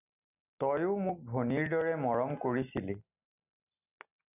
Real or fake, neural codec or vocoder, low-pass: real; none; 3.6 kHz